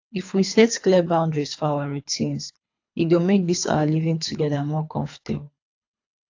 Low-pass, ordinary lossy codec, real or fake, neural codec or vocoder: 7.2 kHz; AAC, 48 kbps; fake; codec, 24 kHz, 3 kbps, HILCodec